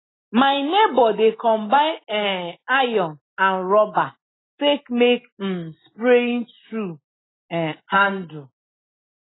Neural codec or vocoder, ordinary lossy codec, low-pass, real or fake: none; AAC, 16 kbps; 7.2 kHz; real